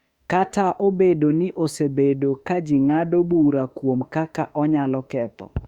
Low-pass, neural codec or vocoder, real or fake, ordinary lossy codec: 19.8 kHz; autoencoder, 48 kHz, 32 numbers a frame, DAC-VAE, trained on Japanese speech; fake; Opus, 64 kbps